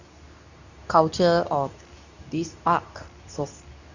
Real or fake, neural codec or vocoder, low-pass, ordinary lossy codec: fake; codec, 24 kHz, 0.9 kbps, WavTokenizer, medium speech release version 2; 7.2 kHz; none